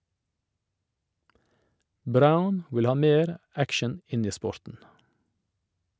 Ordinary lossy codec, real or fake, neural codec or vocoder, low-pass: none; real; none; none